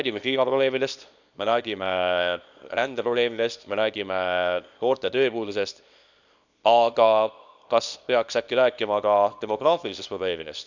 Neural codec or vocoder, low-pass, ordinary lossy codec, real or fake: codec, 24 kHz, 0.9 kbps, WavTokenizer, small release; 7.2 kHz; none; fake